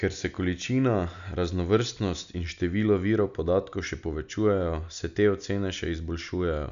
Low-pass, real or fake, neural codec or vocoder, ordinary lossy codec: 7.2 kHz; real; none; none